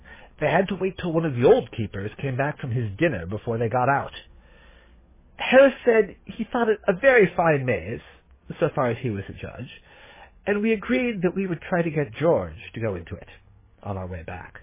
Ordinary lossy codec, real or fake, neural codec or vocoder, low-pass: MP3, 16 kbps; fake; codec, 44.1 kHz, 7.8 kbps, DAC; 3.6 kHz